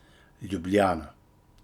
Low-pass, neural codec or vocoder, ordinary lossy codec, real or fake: 19.8 kHz; none; none; real